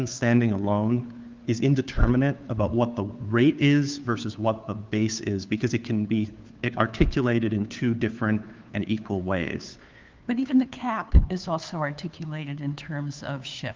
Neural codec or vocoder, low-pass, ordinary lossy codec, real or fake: codec, 16 kHz, 2 kbps, FunCodec, trained on Chinese and English, 25 frames a second; 7.2 kHz; Opus, 32 kbps; fake